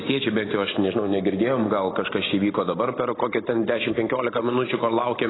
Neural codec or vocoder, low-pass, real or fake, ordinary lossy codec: none; 7.2 kHz; real; AAC, 16 kbps